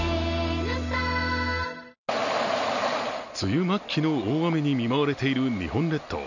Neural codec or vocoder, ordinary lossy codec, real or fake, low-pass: none; none; real; 7.2 kHz